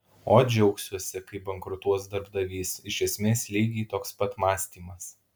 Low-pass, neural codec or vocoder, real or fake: 19.8 kHz; none; real